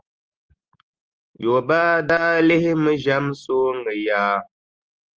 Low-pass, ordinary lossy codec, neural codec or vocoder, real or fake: 7.2 kHz; Opus, 24 kbps; none; real